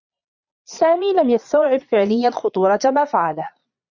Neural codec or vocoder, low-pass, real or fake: vocoder, 22.05 kHz, 80 mel bands, Vocos; 7.2 kHz; fake